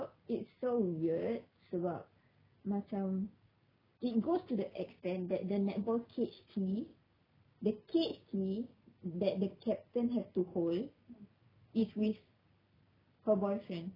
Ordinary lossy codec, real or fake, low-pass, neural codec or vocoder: none; real; 5.4 kHz; none